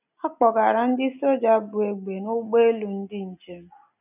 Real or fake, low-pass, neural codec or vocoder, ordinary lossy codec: real; 3.6 kHz; none; none